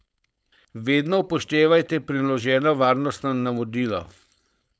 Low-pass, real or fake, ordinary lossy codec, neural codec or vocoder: none; fake; none; codec, 16 kHz, 4.8 kbps, FACodec